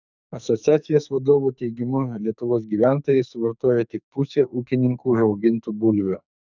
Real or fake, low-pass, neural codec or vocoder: fake; 7.2 kHz; codec, 44.1 kHz, 2.6 kbps, SNAC